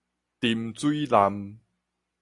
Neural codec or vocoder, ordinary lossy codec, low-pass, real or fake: none; AAC, 64 kbps; 10.8 kHz; real